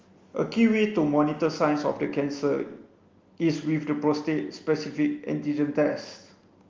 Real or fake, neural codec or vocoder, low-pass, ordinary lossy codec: real; none; 7.2 kHz; Opus, 32 kbps